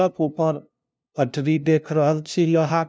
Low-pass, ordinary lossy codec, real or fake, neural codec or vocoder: none; none; fake; codec, 16 kHz, 0.5 kbps, FunCodec, trained on LibriTTS, 25 frames a second